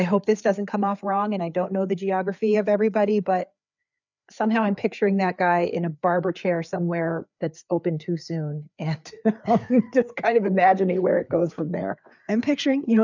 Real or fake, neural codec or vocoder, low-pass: fake; codec, 16 kHz, 4 kbps, FreqCodec, larger model; 7.2 kHz